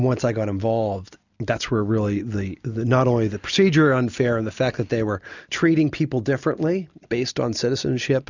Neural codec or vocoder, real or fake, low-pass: none; real; 7.2 kHz